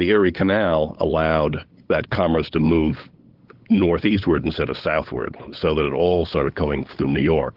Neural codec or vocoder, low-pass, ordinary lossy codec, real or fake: codec, 16 kHz, 8 kbps, FunCodec, trained on LibriTTS, 25 frames a second; 5.4 kHz; Opus, 16 kbps; fake